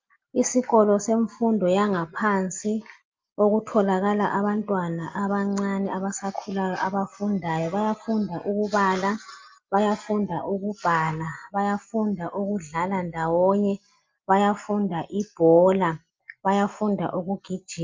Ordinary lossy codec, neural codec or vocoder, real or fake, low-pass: Opus, 24 kbps; none; real; 7.2 kHz